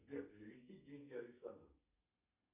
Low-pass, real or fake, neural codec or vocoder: 3.6 kHz; fake; codec, 32 kHz, 1.9 kbps, SNAC